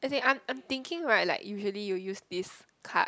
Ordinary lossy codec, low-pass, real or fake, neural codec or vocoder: none; none; real; none